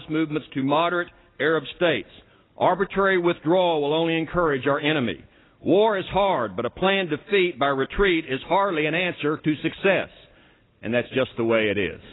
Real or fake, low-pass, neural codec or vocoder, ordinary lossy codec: real; 7.2 kHz; none; AAC, 16 kbps